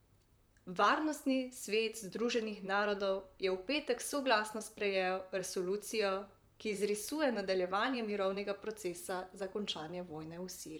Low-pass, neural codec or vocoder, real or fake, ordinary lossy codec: none; vocoder, 44.1 kHz, 128 mel bands, Pupu-Vocoder; fake; none